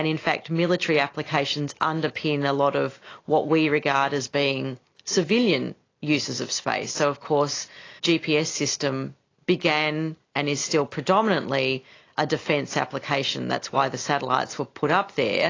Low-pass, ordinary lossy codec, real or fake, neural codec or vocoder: 7.2 kHz; AAC, 32 kbps; real; none